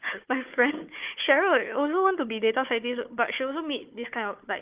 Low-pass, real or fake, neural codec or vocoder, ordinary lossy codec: 3.6 kHz; fake; codec, 16 kHz, 4 kbps, FunCodec, trained on Chinese and English, 50 frames a second; Opus, 32 kbps